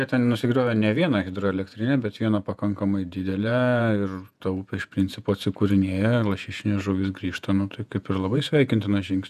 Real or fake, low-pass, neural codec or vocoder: fake; 14.4 kHz; vocoder, 48 kHz, 128 mel bands, Vocos